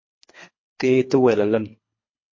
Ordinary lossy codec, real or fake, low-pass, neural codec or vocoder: MP3, 32 kbps; fake; 7.2 kHz; codec, 24 kHz, 3 kbps, HILCodec